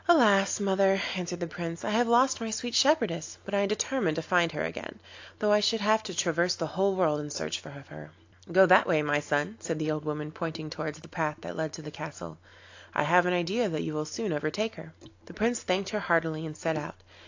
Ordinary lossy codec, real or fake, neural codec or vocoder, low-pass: AAC, 48 kbps; real; none; 7.2 kHz